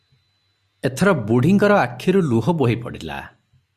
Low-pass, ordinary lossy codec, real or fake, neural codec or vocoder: 14.4 kHz; Opus, 64 kbps; real; none